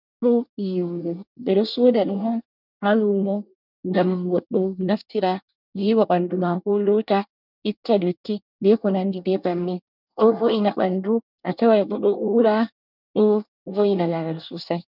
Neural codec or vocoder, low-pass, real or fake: codec, 24 kHz, 1 kbps, SNAC; 5.4 kHz; fake